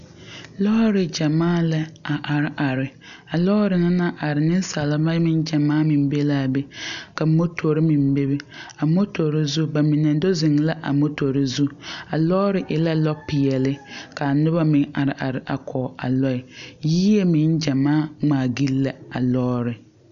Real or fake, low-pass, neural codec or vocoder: real; 7.2 kHz; none